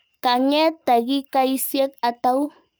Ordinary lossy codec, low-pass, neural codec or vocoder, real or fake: none; none; codec, 44.1 kHz, 7.8 kbps, Pupu-Codec; fake